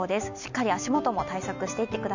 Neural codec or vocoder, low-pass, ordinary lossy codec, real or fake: none; 7.2 kHz; none; real